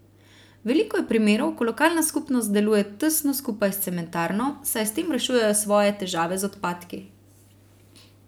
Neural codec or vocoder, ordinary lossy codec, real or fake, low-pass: none; none; real; none